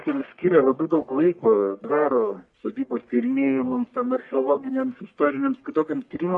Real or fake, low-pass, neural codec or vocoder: fake; 10.8 kHz; codec, 44.1 kHz, 1.7 kbps, Pupu-Codec